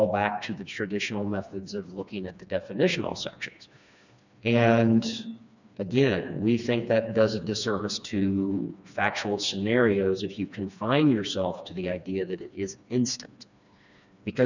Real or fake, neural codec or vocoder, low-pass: fake; codec, 16 kHz, 2 kbps, FreqCodec, smaller model; 7.2 kHz